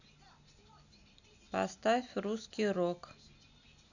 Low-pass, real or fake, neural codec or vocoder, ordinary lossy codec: 7.2 kHz; real; none; none